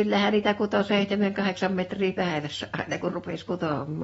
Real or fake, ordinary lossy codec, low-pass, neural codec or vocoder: real; AAC, 24 kbps; 19.8 kHz; none